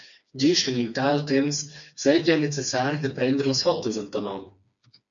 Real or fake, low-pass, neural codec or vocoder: fake; 7.2 kHz; codec, 16 kHz, 2 kbps, FreqCodec, smaller model